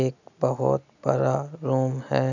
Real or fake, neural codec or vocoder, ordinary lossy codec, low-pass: real; none; none; 7.2 kHz